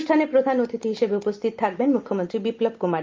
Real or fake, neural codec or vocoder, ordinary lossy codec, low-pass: real; none; Opus, 32 kbps; 7.2 kHz